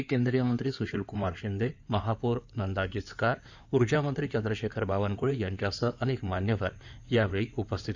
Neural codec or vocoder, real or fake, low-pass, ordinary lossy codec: codec, 16 kHz in and 24 kHz out, 2.2 kbps, FireRedTTS-2 codec; fake; 7.2 kHz; none